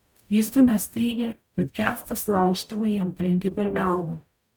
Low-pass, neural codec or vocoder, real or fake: 19.8 kHz; codec, 44.1 kHz, 0.9 kbps, DAC; fake